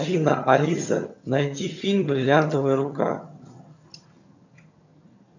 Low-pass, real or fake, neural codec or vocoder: 7.2 kHz; fake; vocoder, 22.05 kHz, 80 mel bands, HiFi-GAN